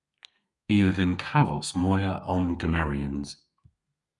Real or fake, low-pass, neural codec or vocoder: fake; 10.8 kHz; codec, 32 kHz, 1.9 kbps, SNAC